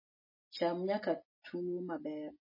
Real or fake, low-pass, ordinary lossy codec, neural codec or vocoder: real; 5.4 kHz; MP3, 24 kbps; none